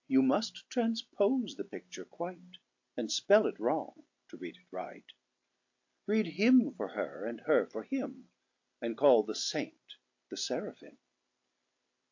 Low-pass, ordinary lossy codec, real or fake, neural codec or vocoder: 7.2 kHz; AAC, 48 kbps; real; none